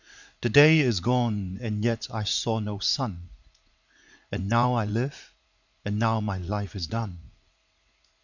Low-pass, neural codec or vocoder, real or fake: 7.2 kHz; vocoder, 44.1 kHz, 80 mel bands, Vocos; fake